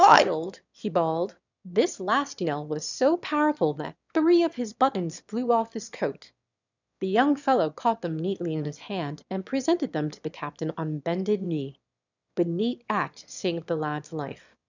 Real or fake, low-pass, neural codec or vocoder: fake; 7.2 kHz; autoencoder, 22.05 kHz, a latent of 192 numbers a frame, VITS, trained on one speaker